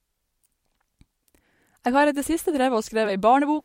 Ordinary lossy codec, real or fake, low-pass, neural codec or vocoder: MP3, 64 kbps; fake; 19.8 kHz; vocoder, 44.1 kHz, 128 mel bands every 256 samples, BigVGAN v2